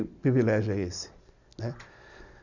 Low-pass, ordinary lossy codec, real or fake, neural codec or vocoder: 7.2 kHz; none; real; none